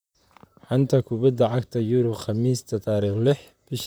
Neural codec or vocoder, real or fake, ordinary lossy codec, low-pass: vocoder, 44.1 kHz, 128 mel bands, Pupu-Vocoder; fake; none; none